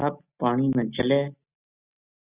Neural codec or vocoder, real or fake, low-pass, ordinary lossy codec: none; real; 3.6 kHz; Opus, 64 kbps